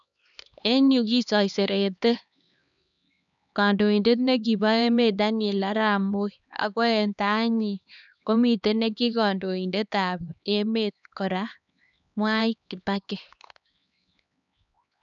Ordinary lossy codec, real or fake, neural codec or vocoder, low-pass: none; fake; codec, 16 kHz, 2 kbps, X-Codec, HuBERT features, trained on LibriSpeech; 7.2 kHz